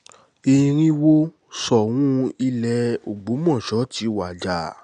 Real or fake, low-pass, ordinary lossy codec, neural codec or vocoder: real; 9.9 kHz; none; none